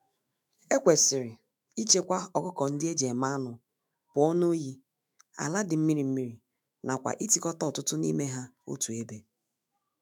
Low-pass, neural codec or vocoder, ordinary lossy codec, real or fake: none; autoencoder, 48 kHz, 128 numbers a frame, DAC-VAE, trained on Japanese speech; none; fake